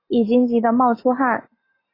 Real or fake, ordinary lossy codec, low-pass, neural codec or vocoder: real; AAC, 24 kbps; 5.4 kHz; none